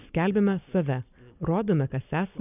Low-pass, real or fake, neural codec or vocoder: 3.6 kHz; real; none